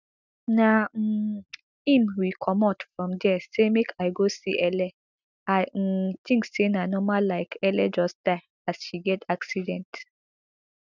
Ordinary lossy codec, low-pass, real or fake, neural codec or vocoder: none; 7.2 kHz; real; none